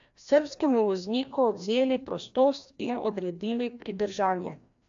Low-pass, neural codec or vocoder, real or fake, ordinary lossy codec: 7.2 kHz; codec, 16 kHz, 1 kbps, FreqCodec, larger model; fake; AAC, 64 kbps